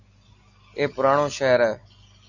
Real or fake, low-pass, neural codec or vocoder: real; 7.2 kHz; none